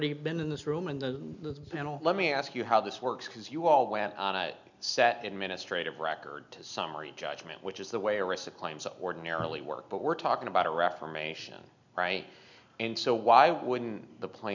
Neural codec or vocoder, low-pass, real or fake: none; 7.2 kHz; real